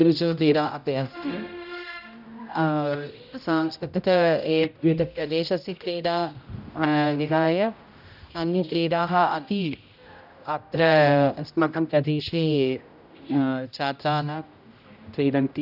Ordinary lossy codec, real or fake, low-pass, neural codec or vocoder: none; fake; 5.4 kHz; codec, 16 kHz, 0.5 kbps, X-Codec, HuBERT features, trained on general audio